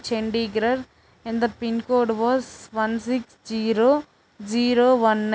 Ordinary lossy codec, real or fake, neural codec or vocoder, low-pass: none; real; none; none